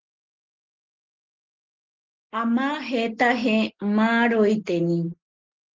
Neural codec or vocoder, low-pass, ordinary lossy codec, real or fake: none; 7.2 kHz; Opus, 16 kbps; real